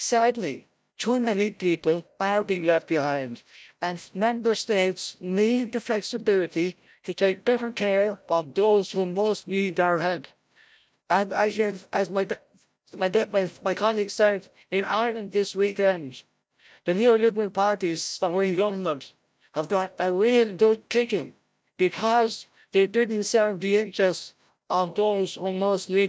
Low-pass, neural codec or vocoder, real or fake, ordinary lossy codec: none; codec, 16 kHz, 0.5 kbps, FreqCodec, larger model; fake; none